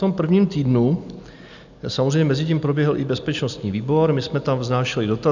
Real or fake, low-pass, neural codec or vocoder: real; 7.2 kHz; none